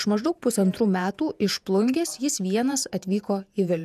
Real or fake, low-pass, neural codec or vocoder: fake; 14.4 kHz; vocoder, 48 kHz, 128 mel bands, Vocos